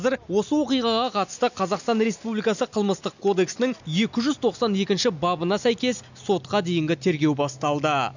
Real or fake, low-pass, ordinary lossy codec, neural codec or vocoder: real; 7.2 kHz; none; none